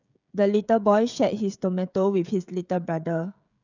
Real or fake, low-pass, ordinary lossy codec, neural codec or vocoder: fake; 7.2 kHz; none; codec, 16 kHz, 16 kbps, FreqCodec, smaller model